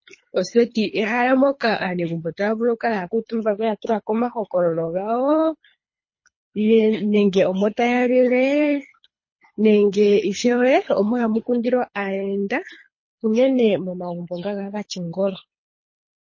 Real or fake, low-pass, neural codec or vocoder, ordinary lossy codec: fake; 7.2 kHz; codec, 24 kHz, 3 kbps, HILCodec; MP3, 32 kbps